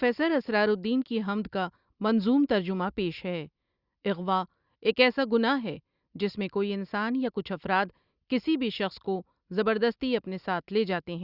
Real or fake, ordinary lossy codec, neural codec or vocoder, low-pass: real; Opus, 64 kbps; none; 5.4 kHz